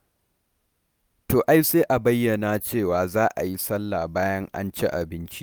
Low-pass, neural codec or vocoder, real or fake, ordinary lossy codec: none; none; real; none